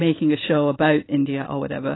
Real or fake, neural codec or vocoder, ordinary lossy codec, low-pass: real; none; AAC, 16 kbps; 7.2 kHz